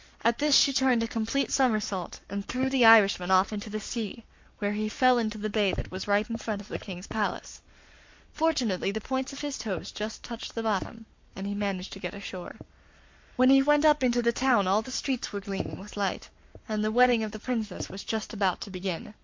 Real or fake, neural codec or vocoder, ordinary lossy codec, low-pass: fake; codec, 44.1 kHz, 7.8 kbps, Pupu-Codec; MP3, 48 kbps; 7.2 kHz